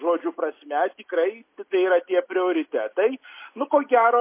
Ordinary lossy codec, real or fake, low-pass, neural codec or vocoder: MP3, 24 kbps; real; 3.6 kHz; none